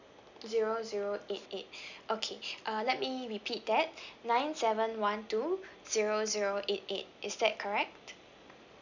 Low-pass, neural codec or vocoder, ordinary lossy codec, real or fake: 7.2 kHz; none; none; real